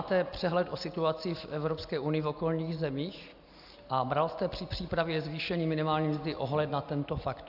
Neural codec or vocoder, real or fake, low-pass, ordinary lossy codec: none; real; 5.4 kHz; AAC, 48 kbps